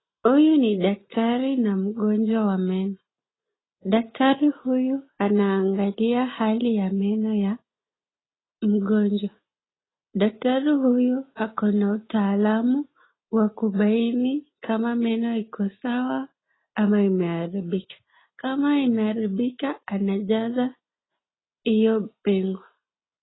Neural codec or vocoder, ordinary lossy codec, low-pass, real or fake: none; AAC, 16 kbps; 7.2 kHz; real